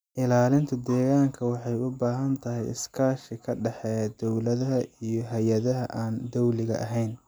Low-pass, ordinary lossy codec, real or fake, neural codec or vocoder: none; none; real; none